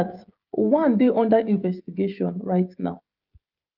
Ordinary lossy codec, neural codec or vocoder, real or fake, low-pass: Opus, 24 kbps; codec, 16 kHz, 16 kbps, FreqCodec, smaller model; fake; 5.4 kHz